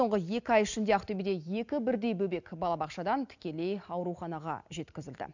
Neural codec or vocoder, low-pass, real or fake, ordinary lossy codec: none; 7.2 kHz; real; none